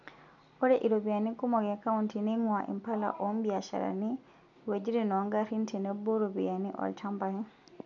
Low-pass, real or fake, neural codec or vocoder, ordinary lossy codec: 7.2 kHz; real; none; none